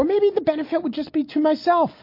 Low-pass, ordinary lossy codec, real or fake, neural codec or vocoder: 5.4 kHz; MP3, 32 kbps; real; none